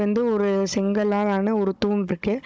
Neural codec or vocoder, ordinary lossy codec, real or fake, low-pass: codec, 16 kHz, 16 kbps, FunCodec, trained on Chinese and English, 50 frames a second; none; fake; none